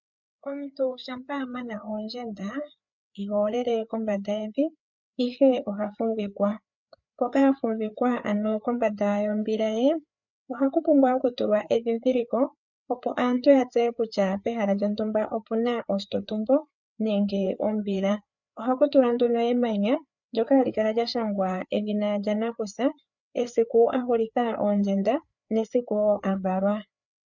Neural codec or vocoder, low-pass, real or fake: codec, 16 kHz, 4 kbps, FreqCodec, larger model; 7.2 kHz; fake